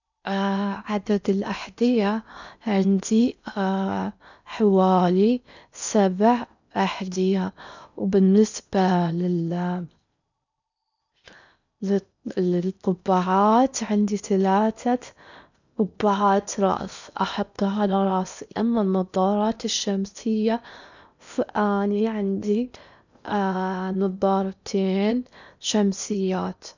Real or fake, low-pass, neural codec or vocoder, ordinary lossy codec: fake; 7.2 kHz; codec, 16 kHz in and 24 kHz out, 0.8 kbps, FocalCodec, streaming, 65536 codes; none